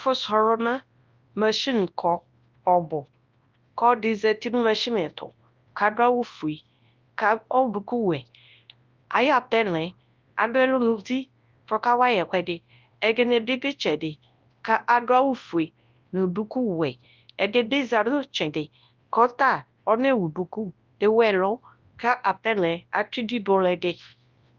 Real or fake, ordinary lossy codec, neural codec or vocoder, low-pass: fake; Opus, 24 kbps; codec, 24 kHz, 0.9 kbps, WavTokenizer, large speech release; 7.2 kHz